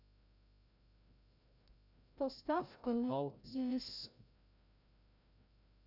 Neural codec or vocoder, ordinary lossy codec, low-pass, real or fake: codec, 16 kHz, 0.5 kbps, FreqCodec, larger model; none; 5.4 kHz; fake